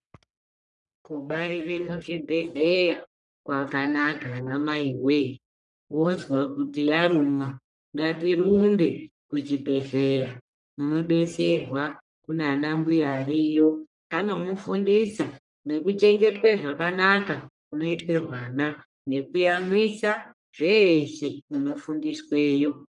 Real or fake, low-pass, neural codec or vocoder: fake; 10.8 kHz; codec, 44.1 kHz, 1.7 kbps, Pupu-Codec